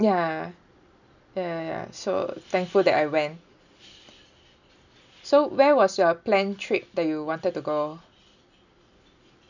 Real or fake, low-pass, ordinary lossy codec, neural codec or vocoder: real; 7.2 kHz; none; none